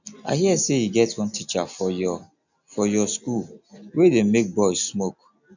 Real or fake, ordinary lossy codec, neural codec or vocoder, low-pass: real; none; none; 7.2 kHz